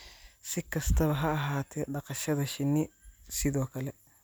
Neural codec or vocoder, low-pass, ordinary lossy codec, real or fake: none; none; none; real